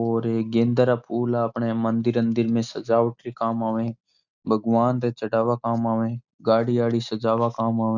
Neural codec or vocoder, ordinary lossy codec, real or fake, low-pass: none; none; real; 7.2 kHz